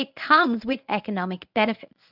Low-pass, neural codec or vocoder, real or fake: 5.4 kHz; codec, 24 kHz, 0.9 kbps, WavTokenizer, medium speech release version 1; fake